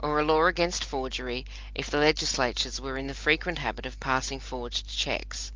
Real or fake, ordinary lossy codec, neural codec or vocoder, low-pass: real; Opus, 32 kbps; none; 7.2 kHz